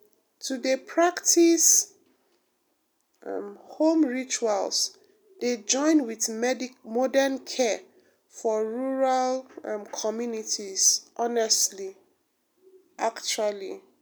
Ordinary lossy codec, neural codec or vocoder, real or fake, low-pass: none; none; real; none